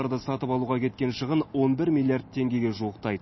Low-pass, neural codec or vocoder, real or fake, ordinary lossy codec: 7.2 kHz; none; real; MP3, 24 kbps